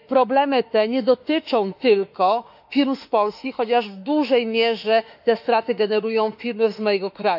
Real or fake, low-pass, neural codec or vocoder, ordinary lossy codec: fake; 5.4 kHz; autoencoder, 48 kHz, 32 numbers a frame, DAC-VAE, trained on Japanese speech; none